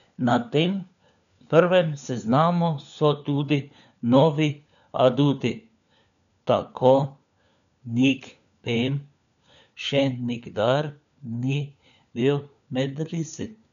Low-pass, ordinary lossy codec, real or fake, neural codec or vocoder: 7.2 kHz; none; fake; codec, 16 kHz, 4 kbps, FunCodec, trained on LibriTTS, 50 frames a second